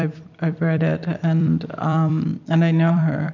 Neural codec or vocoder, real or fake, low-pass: vocoder, 44.1 kHz, 128 mel bands every 256 samples, BigVGAN v2; fake; 7.2 kHz